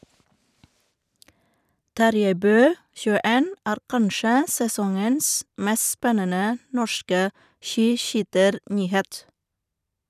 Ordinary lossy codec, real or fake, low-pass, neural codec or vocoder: none; fake; 14.4 kHz; vocoder, 44.1 kHz, 128 mel bands every 512 samples, BigVGAN v2